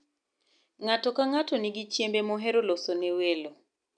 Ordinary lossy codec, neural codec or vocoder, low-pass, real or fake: none; none; 9.9 kHz; real